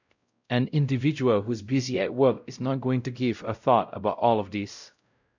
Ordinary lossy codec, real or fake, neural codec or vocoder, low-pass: none; fake; codec, 16 kHz, 0.5 kbps, X-Codec, WavLM features, trained on Multilingual LibriSpeech; 7.2 kHz